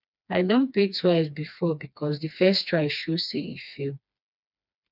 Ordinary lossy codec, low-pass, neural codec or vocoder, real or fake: none; 5.4 kHz; codec, 16 kHz, 2 kbps, FreqCodec, smaller model; fake